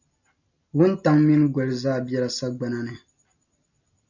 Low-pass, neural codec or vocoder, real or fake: 7.2 kHz; none; real